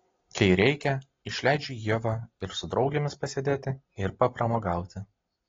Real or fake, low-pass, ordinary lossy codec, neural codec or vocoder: real; 7.2 kHz; AAC, 32 kbps; none